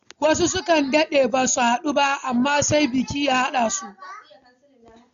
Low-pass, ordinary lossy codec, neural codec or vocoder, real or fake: 7.2 kHz; none; none; real